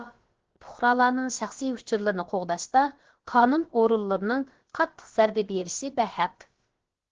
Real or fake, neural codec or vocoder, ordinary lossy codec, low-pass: fake; codec, 16 kHz, about 1 kbps, DyCAST, with the encoder's durations; Opus, 16 kbps; 7.2 kHz